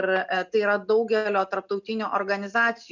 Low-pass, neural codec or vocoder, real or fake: 7.2 kHz; none; real